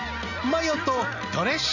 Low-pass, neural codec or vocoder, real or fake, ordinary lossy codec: 7.2 kHz; none; real; none